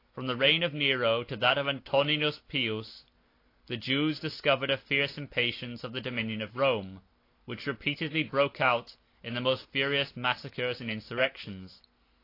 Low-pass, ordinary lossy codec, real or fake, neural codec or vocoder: 5.4 kHz; AAC, 32 kbps; real; none